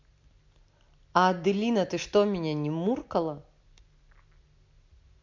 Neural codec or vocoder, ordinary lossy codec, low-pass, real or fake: none; MP3, 48 kbps; 7.2 kHz; real